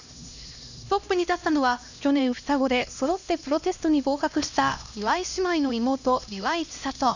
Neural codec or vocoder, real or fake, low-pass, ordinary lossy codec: codec, 16 kHz, 1 kbps, X-Codec, HuBERT features, trained on LibriSpeech; fake; 7.2 kHz; none